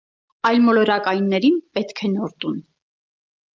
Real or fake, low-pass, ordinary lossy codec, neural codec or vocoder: real; 7.2 kHz; Opus, 24 kbps; none